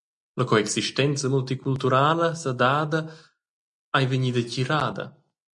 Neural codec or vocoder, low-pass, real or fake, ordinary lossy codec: none; 10.8 kHz; real; MP3, 64 kbps